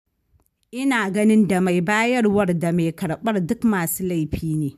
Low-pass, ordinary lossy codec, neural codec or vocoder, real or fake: 14.4 kHz; none; none; real